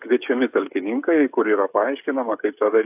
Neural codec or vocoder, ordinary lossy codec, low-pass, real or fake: codec, 16 kHz, 8 kbps, FreqCodec, smaller model; AAC, 32 kbps; 3.6 kHz; fake